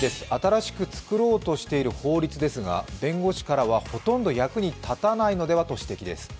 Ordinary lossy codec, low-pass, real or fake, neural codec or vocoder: none; none; real; none